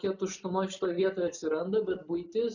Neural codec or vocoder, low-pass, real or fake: none; 7.2 kHz; real